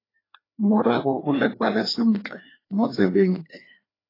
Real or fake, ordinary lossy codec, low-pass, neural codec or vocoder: fake; AAC, 24 kbps; 5.4 kHz; codec, 16 kHz, 2 kbps, FreqCodec, larger model